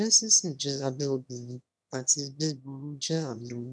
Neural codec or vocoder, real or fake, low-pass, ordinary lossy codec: autoencoder, 22.05 kHz, a latent of 192 numbers a frame, VITS, trained on one speaker; fake; none; none